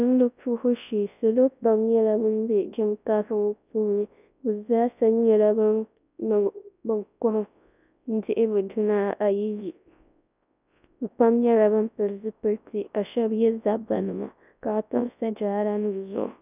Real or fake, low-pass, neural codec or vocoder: fake; 3.6 kHz; codec, 24 kHz, 0.9 kbps, WavTokenizer, large speech release